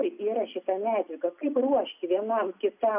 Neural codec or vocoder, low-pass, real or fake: none; 3.6 kHz; real